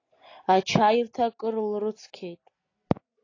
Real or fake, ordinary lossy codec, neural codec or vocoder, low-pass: real; AAC, 32 kbps; none; 7.2 kHz